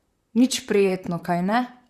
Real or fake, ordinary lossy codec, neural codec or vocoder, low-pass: fake; Opus, 64 kbps; vocoder, 44.1 kHz, 128 mel bands, Pupu-Vocoder; 14.4 kHz